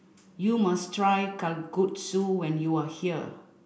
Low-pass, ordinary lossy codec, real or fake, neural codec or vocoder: none; none; real; none